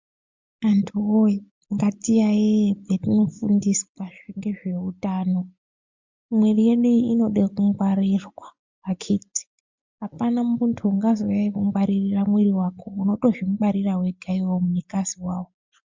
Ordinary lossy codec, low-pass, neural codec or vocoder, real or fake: MP3, 64 kbps; 7.2 kHz; none; real